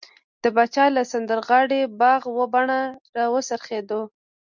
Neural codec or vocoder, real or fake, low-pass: none; real; 7.2 kHz